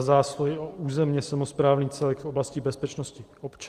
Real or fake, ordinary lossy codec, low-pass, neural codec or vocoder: real; Opus, 24 kbps; 14.4 kHz; none